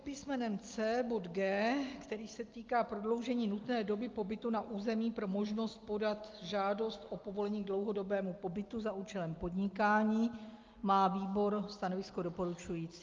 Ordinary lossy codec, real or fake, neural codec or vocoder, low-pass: Opus, 32 kbps; real; none; 7.2 kHz